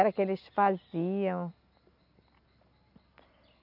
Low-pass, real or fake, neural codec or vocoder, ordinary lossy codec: 5.4 kHz; real; none; none